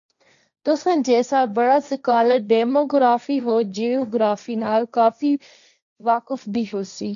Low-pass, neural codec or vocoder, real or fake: 7.2 kHz; codec, 16 kHz, 1.1 kbps, Voila-Tokenizer; fake